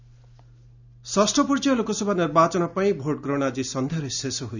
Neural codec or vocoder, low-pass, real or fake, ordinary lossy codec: none; 7.2 kHz; real; none